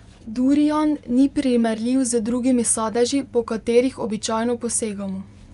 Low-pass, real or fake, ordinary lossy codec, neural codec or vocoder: 10.8 kHz; fake; none; vocoder, 24 kHz, 100 mel bands, Vocos